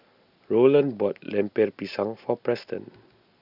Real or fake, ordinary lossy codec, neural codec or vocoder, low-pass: real; none; none; 5.4 kHz